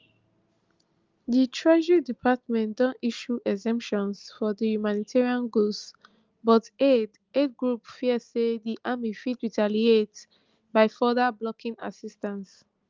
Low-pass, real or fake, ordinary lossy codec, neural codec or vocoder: 7.2 kHz; real; Opus, 32 kbps; none